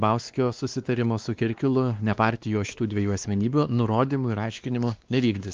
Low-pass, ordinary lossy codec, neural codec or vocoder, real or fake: 7.2 kHz; Opus, 24 kbps; codec, 16 kHz, 2 kbps, X-Codec, WavLM features, trained on Multilingual LibriSpeech; fake